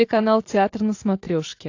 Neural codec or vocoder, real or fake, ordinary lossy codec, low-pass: none; real; AAC, 48 kbps; 7.2 kHz